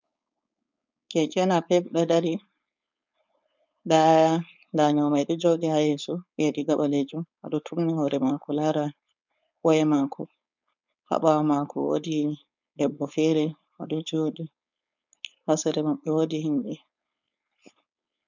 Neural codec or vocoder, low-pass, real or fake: codec, 16 kHz, 4.8 kbps, FACodec; 7.2 kHz; fake